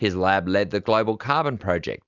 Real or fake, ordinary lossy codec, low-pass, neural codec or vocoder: real; Opus, 64 kbps; 7.2 kHz; none